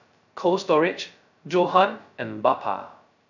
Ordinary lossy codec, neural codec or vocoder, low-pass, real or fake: none; codec, 16 kHz, 0.2 kbps, FocalCodec; 7.2 kHz; fake